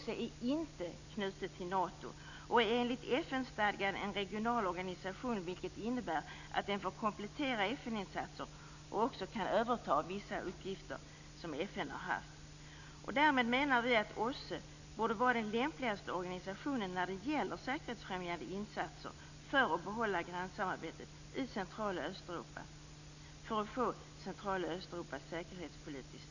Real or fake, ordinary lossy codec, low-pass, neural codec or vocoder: real; none; 7.2 kHz; none